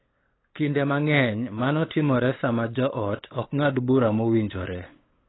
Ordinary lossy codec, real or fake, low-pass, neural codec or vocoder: AAC, 16 kbps; fake; 7.2 kHz; codec, 16 kHz, 8 kbps, FunCodec, trained on LibriTTS, 25 frames a second